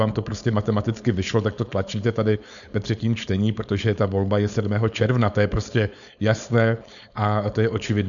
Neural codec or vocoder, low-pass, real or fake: codec, 16 kHz, 4.8 kbps, FACodec; 7.2 kHz; fake